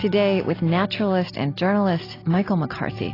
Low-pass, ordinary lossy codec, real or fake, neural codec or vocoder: 5.4 kHz; AAC, 24 kbps; real; none